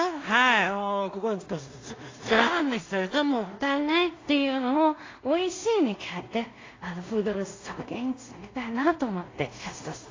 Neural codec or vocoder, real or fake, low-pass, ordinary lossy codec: codec, 16 kHz in and 24 kHz out, 0.4 kbps, LongCat-Audio-Codec, two codebook decoder; fake; 7.2 kHz; AAC, 32 kbps